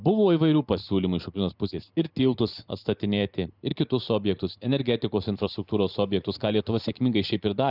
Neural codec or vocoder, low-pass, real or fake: vocoder, 44.1 kHz, 128 mel bands every 512 samples, BigVGAN v2; 5.4 kHz; fake